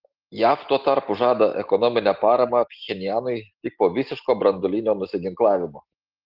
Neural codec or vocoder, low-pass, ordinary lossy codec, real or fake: none; 5.4 kHz; Opus, 16 kbps; real